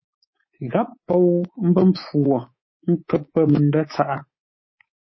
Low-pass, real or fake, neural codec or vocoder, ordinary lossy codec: 7.2 kHz; fake; codec, 24 kHz, 3.1 kbps, DualCodec; MP3, 24 kbps